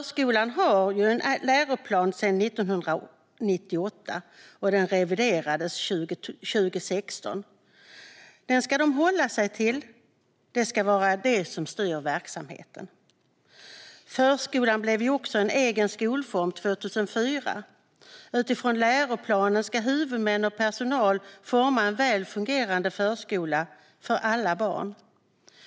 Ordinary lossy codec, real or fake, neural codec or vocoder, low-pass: none; real; none; none